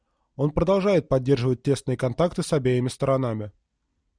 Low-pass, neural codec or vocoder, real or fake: 9.9 kHz; none; real